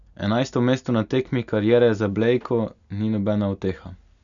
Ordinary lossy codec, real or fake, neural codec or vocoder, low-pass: none; real; none; 7.2 kHz